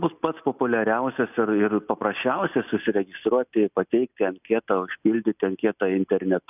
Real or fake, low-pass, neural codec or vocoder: real; 3.6 kHz; none